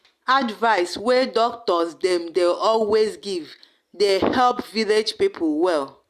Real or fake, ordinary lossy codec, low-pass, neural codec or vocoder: real; Opus, 64 kbps; 14.4 kHz; none